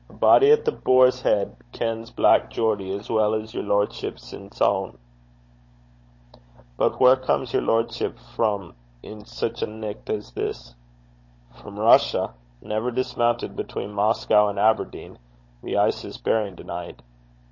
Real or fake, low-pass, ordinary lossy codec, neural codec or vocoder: fake; 7.2 kHz; MP3, 32 kbps; codec, 16 kHz, 16 kbps, FunCodec, trained on Chinese and English, 50 frames a second